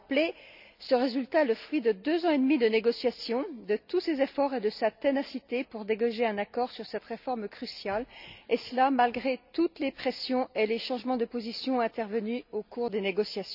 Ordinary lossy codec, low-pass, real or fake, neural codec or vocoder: none; 5.4 kHz; real; none